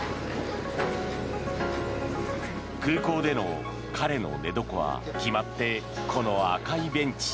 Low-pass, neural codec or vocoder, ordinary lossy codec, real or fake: none; none; none; real